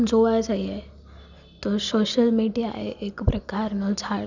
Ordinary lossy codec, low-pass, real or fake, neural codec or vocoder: none; 7.2 kHz; real; none